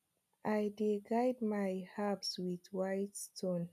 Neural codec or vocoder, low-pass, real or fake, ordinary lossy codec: none; 14.4 kHz; real; none